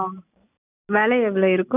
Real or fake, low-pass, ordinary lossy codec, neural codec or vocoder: real; 3.6 kHz; none; none